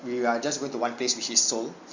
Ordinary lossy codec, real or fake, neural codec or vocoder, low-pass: Opus, 64 kbps; real; none; 7.2 kHz